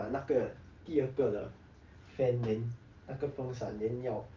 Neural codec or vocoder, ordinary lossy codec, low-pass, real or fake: none; Opus, 32 kbps; 7.2 kHz; real